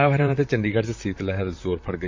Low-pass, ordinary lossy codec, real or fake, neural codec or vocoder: 7.2 kHz; MP3, 48 kbps; fake; vocoder, 44.1 kHz, 128 mel bands, Pupu-Vocoder